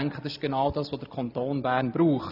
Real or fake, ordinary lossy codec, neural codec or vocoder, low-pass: real; none; none; 5.4 kHz